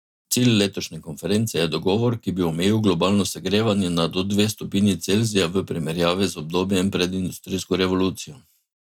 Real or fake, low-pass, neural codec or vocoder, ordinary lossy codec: real; 19.8 kHz; none; none